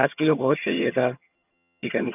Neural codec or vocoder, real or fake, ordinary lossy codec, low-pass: vocoder, 22.05 kHz, 80 mel bands, HiFi-GAN; fake; none; 3.6 kHz